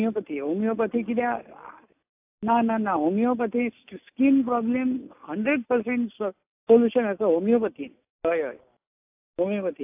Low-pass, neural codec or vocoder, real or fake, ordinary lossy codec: 3.6 kHz; none; real; AAC, 32 kbps